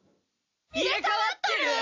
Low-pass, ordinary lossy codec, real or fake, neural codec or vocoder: 7.2 kHz; AAC, 48 kbps; fake; vocoder, 44.1 kHz, 128 mel bands every 256 samples, BigVGAN v2